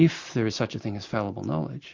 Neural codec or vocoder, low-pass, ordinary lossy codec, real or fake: none; 7.2 kHz; MP3, 48 kbps; real